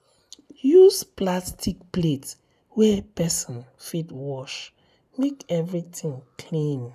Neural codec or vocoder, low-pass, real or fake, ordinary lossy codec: none; 14.4 kHz; real; none